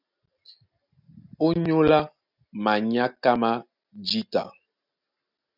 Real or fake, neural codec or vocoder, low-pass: real; none; 5.4 kHz